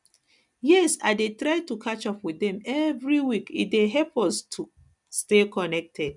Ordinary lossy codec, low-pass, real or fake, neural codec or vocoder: none; 10.8 kHz; real; none